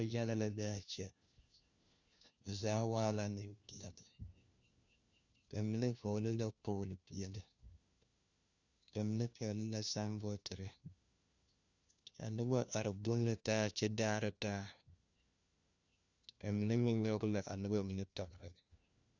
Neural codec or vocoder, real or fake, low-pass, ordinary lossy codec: codec, 16 kHz, 1 kbps, FunCodec, trained on LibriTTS, 50 frames a second; fake; 7.2 kHz; Opus, 64 kbps